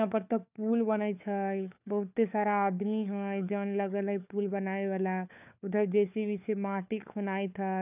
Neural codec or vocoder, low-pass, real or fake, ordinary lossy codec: codec, 16 kHz, 4 kbps, FunCodec, trained on LibriTTS, 50 frames a second; 3.6 kHz; fake; none